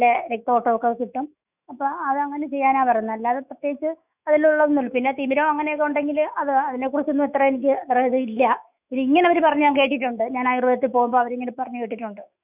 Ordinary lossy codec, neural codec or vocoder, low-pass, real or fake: none; autoencoder, 48 kHz, 128 numbers a frame, DAC-VAE, trained on Japanese speech; 3.6 kHz; fake